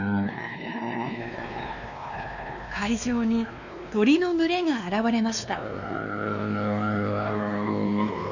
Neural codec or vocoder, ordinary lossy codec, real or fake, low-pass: codec, 16 kHz, 2 kbps, X-Codec, WavLM features, trained on Multilingual LibriSpeech; none; fake; 7.2 kHz